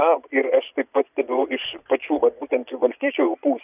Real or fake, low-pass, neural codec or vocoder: fake; 3.6 kHz; vocoder, 22.05 kHz, 80 mel bands, Vocos